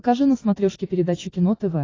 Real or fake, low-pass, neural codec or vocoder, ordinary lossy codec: real; 7.2 kHz; none; AAC, 32 kbps